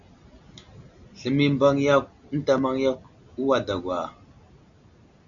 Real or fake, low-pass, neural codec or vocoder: real; 7.2 kHz; none